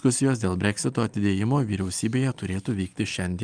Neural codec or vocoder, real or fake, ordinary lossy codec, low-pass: none; real; Opus, 32 kbps; 9.9 kHz